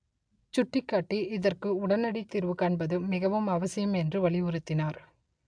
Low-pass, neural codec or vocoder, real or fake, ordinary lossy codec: 9.9 kHz; none; real; AAC, 64 kbps